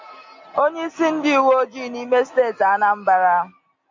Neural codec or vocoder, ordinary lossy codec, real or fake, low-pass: none; MP3, 64 kbps; real; 7.2 kHz